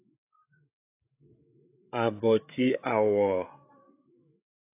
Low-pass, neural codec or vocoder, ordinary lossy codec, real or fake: 3.6 kHz; codec, 16 kHz, 8 kbps, FreqCodec, larger model; AAC, 24 kbps; fake